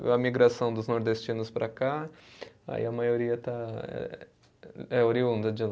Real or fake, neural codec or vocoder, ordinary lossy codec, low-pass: real; none; none; none